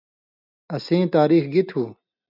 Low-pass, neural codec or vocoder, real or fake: 5.4 kHz; none; real